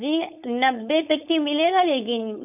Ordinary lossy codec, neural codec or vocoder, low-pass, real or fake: none; codec, 16 kHz, 4.8 kbps, FACodec; 3.6 kHz; fake